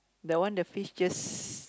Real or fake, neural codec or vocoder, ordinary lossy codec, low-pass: real; none; none; none